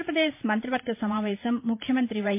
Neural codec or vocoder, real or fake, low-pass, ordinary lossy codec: vocoder, 44.1 kHz, 128 mel bands every 512 samples, BigVGAN v2; fake; 3.6 kHz; MP3, 24 kbps